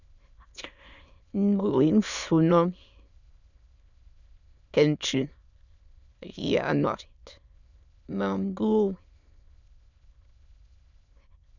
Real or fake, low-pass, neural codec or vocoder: fake; 7.2 kHz; autoencoder, 22.05 kHz, a latent of 192 numbers a frame, VITS, trained on many speakers